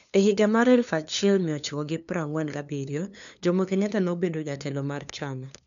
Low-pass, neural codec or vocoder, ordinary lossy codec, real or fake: 7.2 kHz; codec, 16 kHz, 2 kbps, FunCodec, trained on LibriTTS, 25 frames a second; none; fake